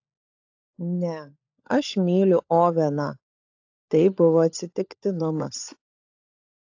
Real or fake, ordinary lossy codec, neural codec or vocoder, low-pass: fake; AAC, 48 kbps; codec, 16 kHz, 16 kbps, FunCodec, trained on LibriTTS, 50 frames a second; 7.2 kHz